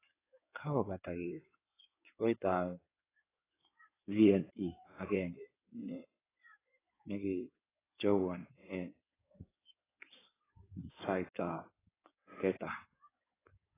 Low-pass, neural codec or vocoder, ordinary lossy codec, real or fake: 3.6 kHz; codec, 16 kHz in and 24 kHz out, 2.2 kbps, FireRedTTS-2 codec; AAC, 16 kbps; fake